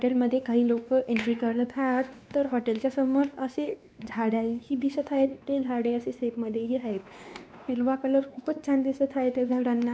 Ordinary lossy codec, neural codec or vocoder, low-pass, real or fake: none; codec, 16 kHz, 2 kbps, X-Codec, WavLM features, trained on Multilingual LibriSpeech; none; fake